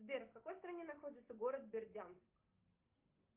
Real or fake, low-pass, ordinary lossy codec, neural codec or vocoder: real; 3.6 kHz; Opus, 24 kbps; none